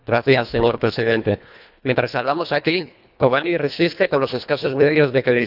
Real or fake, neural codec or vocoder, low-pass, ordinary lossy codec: fake; codec, 24 kHz, 1.5 kbps, HILCodec; 5.4 kHz; none